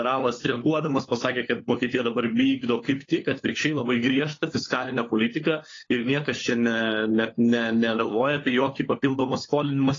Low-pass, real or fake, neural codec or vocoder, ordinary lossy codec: 7.2 kHz; fake; codec, 16 kHz, 4 kbps, FunCodec, trained on LibriTTS, 50 frames a second; AAC, 32 kbps